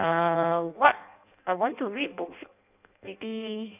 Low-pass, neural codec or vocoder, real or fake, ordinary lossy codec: 3.6 kHz; codec, 16 kHz in and 24 kHz out, 0.6 kbps, FireRedTTS-2 codec; fake; none